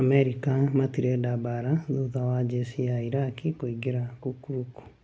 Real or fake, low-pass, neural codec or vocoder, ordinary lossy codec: real; none; none; none